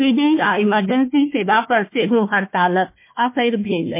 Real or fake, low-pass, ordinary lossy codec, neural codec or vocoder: fake; 3.6 kHz; MP3, 24 kbps; codec, 16 kHz, 1 kbps, FunCodec, trained on Chinese and English, 50 frames a second